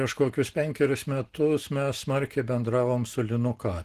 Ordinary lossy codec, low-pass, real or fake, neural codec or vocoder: Opus, 16 kbps; 14.4 kHz; real; none